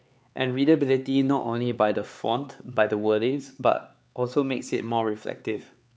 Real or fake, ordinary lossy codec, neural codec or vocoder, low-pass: fake; none; codec, 16 kHz, 4 kbps, X-Codec, HuBERT features, trained on LibriSpeech; none